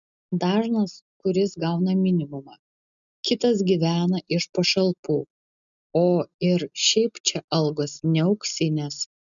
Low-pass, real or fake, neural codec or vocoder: 7.2 kHz; real; none